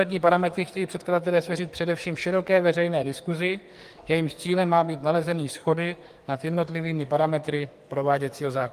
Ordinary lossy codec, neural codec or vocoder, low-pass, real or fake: Opus, 32 kbps; codec, 44.1 kHz, 2.6 kbps, SNAC; 14.4 kHz; fake